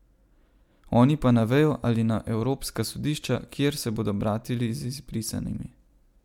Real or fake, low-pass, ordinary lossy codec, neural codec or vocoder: real; 19.8 kHz; MP3, 96 kbps; none